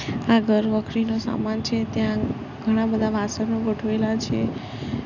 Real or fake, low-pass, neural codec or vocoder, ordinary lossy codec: real; 7.2 kHz; none; none